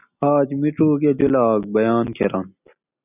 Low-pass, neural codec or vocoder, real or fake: 3.6 kHz; none; real